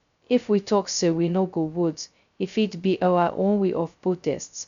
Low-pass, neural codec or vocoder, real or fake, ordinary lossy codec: 7.2 kHz; codec, 16 kHz, 0.2 kbps, FocalCodec; fake; none